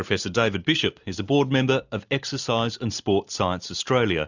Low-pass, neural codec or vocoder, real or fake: 7.2 kHz; none; real